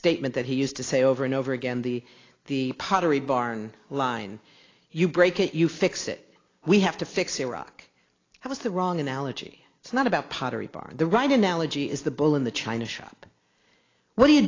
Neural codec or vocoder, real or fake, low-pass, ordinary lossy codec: none; real; 7.2 kHz; AAC, 32 kbps